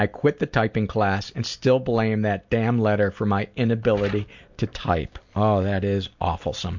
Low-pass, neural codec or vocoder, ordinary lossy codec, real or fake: 7.2 kHz; none; MP3, 64 kbps; real